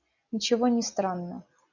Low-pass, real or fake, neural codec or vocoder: 7.2 kHz; real; none